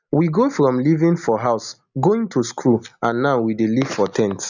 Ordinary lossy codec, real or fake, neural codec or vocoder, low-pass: none; real; none; 7.2 kHz